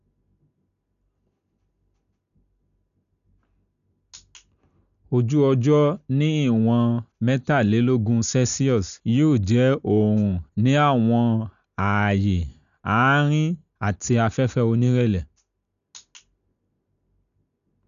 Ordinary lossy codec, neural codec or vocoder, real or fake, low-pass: none; none; real; 7.2 kHz